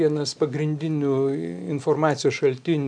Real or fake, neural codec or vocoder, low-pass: real; none; 9.9 kHz